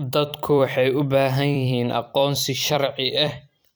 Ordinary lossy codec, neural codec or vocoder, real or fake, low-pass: none; vocoder, 44.1 kHz, 128 mel bands every 256 samples, BigVGAN v2; fake; none